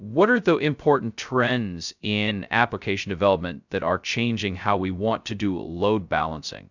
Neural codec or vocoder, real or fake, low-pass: codec, 16 kHz, 0.2 kbps, FocalCodec; fake; 7.2 kHz